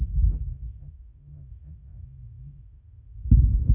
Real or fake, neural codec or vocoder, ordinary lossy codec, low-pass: fake; autoencoder, 48 kHz, 32 numbers a frame, DAC-VAE, trained on Japanese speech; none; 3.6 kHz